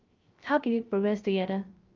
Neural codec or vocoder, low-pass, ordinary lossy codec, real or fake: codec, 16 kHz, 0.3 kbps, FocalCodec; 7.2 kHz; Opus, 24 kbps; fake